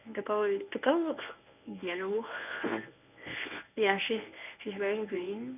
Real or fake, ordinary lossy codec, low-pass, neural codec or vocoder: fake; none; 3.6 kHz; codec, 24 kHz, 0.9 kbps, WavTokenizer, medium speech release version 1